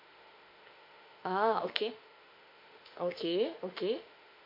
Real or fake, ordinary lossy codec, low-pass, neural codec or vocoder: fake; MP3, 32 kbps; 5.4 kHz; autoencoder, 48 kHz, 32 numbers a frame, DAC-VAE, trained on Japanese speech